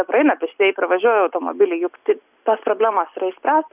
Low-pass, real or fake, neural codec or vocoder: 3.6 kHz; real; none